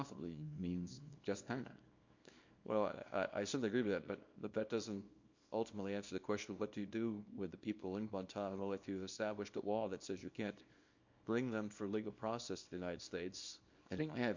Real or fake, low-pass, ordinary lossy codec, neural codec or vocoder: fake; 7.2 kHz; MP3, 48 kbps; codec, 24 kHz, 0.9 kbps, WavTokenizer, small release